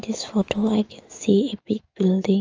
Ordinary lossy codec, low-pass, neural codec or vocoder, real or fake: Opus, 24 kbps; 7.2 kHz; autoencoder, 48 kHz, 128 numbers a frame, DAC-VAE, trained on Japanese speech; fake